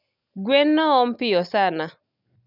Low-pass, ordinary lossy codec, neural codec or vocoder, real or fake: 5.4 kHz; none; none; real